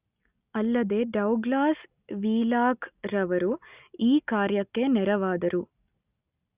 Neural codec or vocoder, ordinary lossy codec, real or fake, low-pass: none; Opus, 64 kbps; real; 3.6 kHz